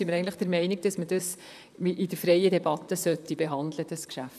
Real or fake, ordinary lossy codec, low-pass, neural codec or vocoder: fake; none; 14.4 kHz; vocoder, 44.1 kHz, 128 mel bands, Pupu-Vocoder